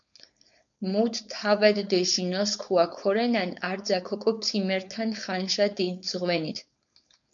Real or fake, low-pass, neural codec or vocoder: fake; 7.2 kHz; codec, 16 kHz, 4.8 kbps, FACodec